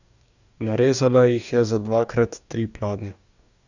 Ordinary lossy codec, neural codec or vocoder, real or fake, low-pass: none; codec, 44.1 kHz, 2.6 kbps, DAC; fake; 7.2 kHz